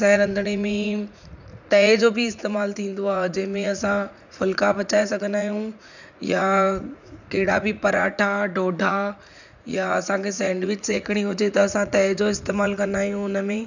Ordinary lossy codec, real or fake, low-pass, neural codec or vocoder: none; fake; 7.2 kHz; vocoder, 44.1 kHz, 80 mel bands, Vocos